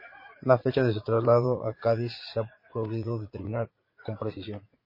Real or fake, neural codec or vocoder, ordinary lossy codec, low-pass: fake; vocoder, 44.1 kHz, 80 mel bands, Vocos; MP3, 24 kbps; 5.4 kHz